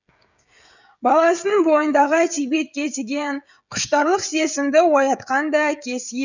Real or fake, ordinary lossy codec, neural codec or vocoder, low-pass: fake; none; codec, 16 kHz, 16 kbps, FreqCodec, smaller model; 7.2 kHz